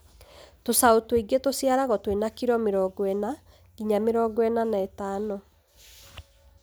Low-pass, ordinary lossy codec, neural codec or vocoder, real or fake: none; none; none; real